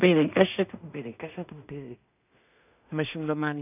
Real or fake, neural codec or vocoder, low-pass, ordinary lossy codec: fake; codec, 16 kHz in and 24 kHz out, 0.4 kbps, LongCat-Audio-Codec, two codebook decoder; 3.6 kHz; none